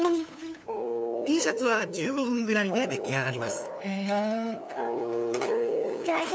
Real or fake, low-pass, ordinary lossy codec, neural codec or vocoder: fake; none; none; codec, 16 kHz, 2 kbps, FunCodec, trained on LibriTTS, 25 frames a second